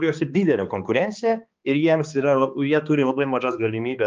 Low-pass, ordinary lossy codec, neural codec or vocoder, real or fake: 7.2 kHz; Opus, 24 kbps; codec, 16 kHz, 2 kbps, X-Codec, HuBERT features, trained on balanced general audio; fake